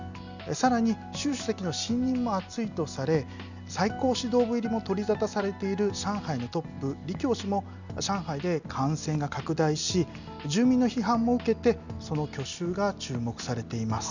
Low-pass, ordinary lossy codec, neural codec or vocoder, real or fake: 7.2 kHz; none; none; real